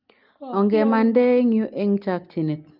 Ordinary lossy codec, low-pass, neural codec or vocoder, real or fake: Opus, 24 kbps; 5.4 kHz; none; real